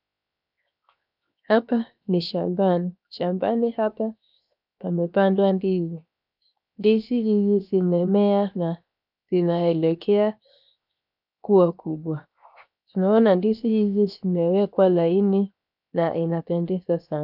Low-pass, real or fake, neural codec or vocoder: 5.4 kHz; fake; codec, 16 kHz, 0.7 kbps, FocalCodec